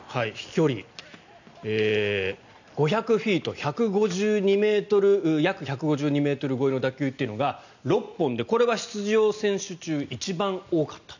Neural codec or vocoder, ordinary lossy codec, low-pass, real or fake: none; none; 7.2 kHz; real